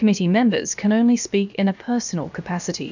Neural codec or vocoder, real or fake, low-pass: codec, 16 kHz, about 1 kbps, DyCAST, with the encoder's durations; fake; 7.2 kHz